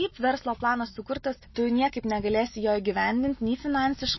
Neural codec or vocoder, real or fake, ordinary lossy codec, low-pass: none; real; MP3, 24 kbps; 7.2 kHz